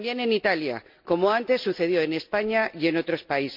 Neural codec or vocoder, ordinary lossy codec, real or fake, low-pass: none; MP3, 48 kbps; real; 5.4 kHz